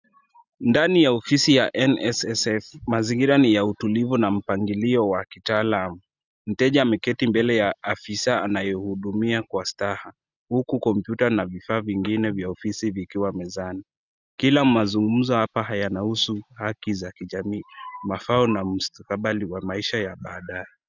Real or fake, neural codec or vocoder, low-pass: real; none; 7.2 kHz